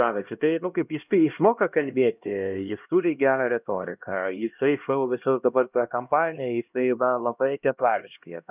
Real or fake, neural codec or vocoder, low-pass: fake; codec, 16 kHz, 1 kbps, X-Codec, HuBERT features, trained on LibriSpeech; 3.6 kHz